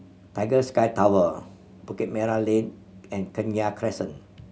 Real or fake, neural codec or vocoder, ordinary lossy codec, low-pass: real; none; none; none